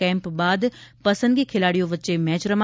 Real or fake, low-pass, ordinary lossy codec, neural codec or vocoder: real; none; none; none